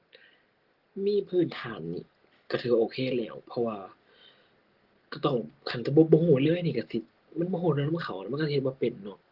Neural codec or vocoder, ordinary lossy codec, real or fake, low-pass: none; Opus, 16 kbps; real; 5.4 kHz